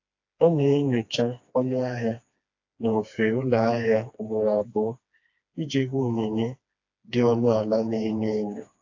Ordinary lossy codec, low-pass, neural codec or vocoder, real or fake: AAC, 48 kbps; 7.2 kHz; codec, 16 kHz, 2 kbps, FreqCodec, smaller model; fake